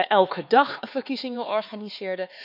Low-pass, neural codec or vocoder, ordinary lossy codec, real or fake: 5.4 kHz; codec, 16 kHz, 4 kbps, X-Codec, HuBERT features, trained on LibriSpeech; none; fake